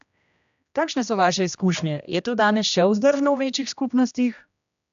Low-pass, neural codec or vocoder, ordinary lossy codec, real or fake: 7.2 kHz; codec, 16 kHz, 1 kbps, X-Codec, HuBERT features, trained on general audio; AAC, 96 kbps; fake